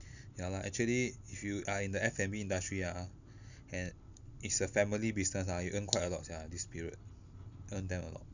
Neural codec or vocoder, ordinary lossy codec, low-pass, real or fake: none; none; 7.2 kHz; real